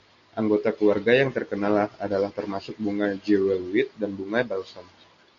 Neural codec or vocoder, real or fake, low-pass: none; real; 7.2 kHz